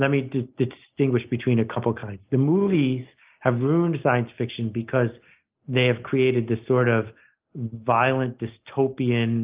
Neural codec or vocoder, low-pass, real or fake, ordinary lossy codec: none; 3.6 kHz; real; Opus, 32 kbps